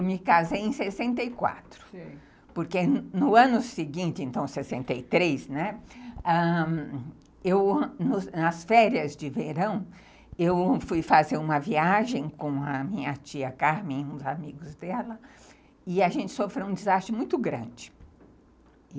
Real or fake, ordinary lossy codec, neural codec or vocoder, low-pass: real; none; none; none